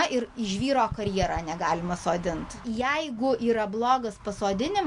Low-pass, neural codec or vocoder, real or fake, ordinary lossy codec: 10.8 kHz; none; real; MP3, 64 kbps